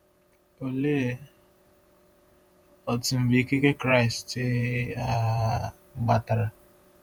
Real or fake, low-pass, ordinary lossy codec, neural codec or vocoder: fake; none; none; vocoder, 48 kHz, 128 mel bands, Vocos